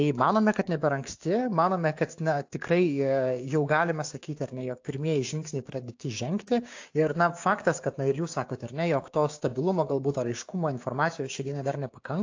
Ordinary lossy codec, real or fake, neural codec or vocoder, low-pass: AAC, 48 kbps; fake; codec, 16 kHz, 6 kbps, DAC; 7.2 kHz